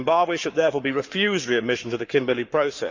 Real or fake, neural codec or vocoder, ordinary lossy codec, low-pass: fake; codec, 44.1 kHz, 7.8 kbps, Pupu-Codec; Opus, 64 kbps; 7.2 kHz